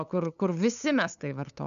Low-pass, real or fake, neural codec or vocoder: 7.2 kHz; fake; codec, 16 kHz, 8 kbps, FunCodec, trained on LibriTTS, 25 frames a second